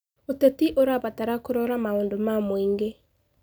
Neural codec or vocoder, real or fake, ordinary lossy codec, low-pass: none; real; none; none